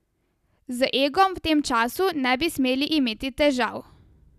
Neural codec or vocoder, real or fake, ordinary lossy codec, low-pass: none; real; none; 14.4 kHz